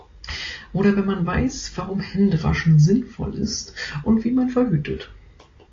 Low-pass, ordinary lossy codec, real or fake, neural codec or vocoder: 7.2 kHz; AAC, 32 kbps; real; none